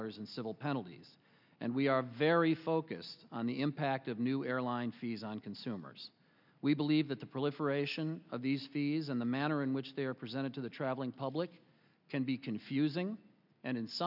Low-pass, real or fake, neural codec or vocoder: 5.4 kHz; real; none